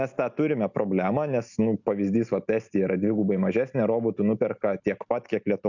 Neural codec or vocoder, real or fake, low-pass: none; real; 7.2 kHz